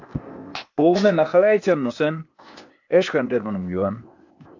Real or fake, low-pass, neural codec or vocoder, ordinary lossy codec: fake; 7.2 kHz; codec, 16 kHz, 0.8 kbps, ZipCodec; AAC, 48 kbps